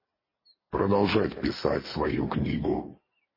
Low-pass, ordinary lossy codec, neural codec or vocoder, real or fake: 5.4 kHz; MP3, 24 kbps; none; real